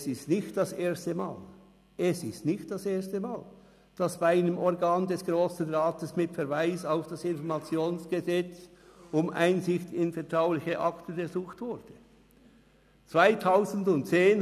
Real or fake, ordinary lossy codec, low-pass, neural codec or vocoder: real; none; 14.4 kHz; none